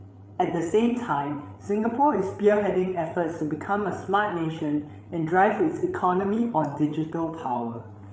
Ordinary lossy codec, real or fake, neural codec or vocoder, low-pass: none; fake; codec, 16 kHz, 8 kbps, FreqCodec, larger model; none